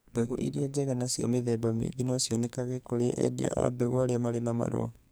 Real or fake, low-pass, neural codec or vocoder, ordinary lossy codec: fake; none; codec, 44.1 kHz, 2.6 kbps, SNAC; none